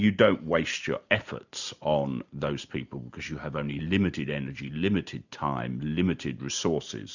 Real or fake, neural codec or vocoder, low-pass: real; none; 7.2 kHz